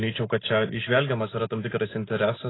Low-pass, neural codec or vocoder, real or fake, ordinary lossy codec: 7.2 kHz; none; real; AAC, 16 kbps